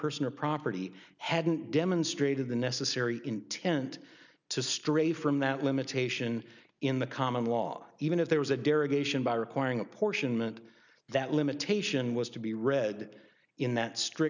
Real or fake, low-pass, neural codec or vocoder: real; 7.2 kHz; none